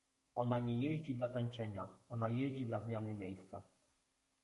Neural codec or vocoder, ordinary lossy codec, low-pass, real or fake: codec, 32 kHz, 1.9 kbps, SNAC; MP3, 48 kbps; 14.4 kHz; fake